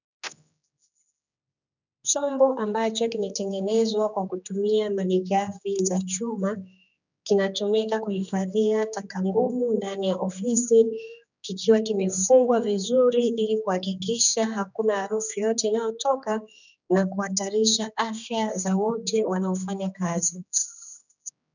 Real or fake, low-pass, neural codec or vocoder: fake; 7.2 kHz; codec, 16 kHz, 2 kbps, X-Codec, HuBERT features, trained on general audio